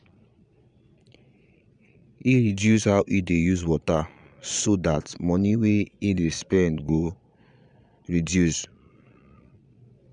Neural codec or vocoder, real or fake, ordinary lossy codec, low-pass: vocoder, 22.05 kHz, 80 mel bands, Vocos; fake; none; 9.9 kHz